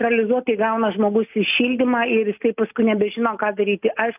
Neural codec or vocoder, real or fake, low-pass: none; real; 3.6 kHz